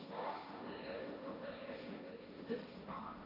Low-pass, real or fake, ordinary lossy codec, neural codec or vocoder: 5.4 kHz; fake; AAC, 24 kbps; codec, 16 kHz, 1.1 kbps, Voila-Tokenizer